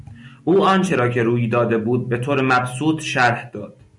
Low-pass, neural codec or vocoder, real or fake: 10.8 kHz; none; real